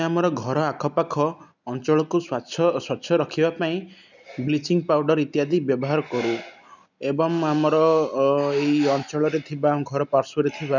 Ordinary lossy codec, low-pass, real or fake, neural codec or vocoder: none; 7.2 kHz; real; none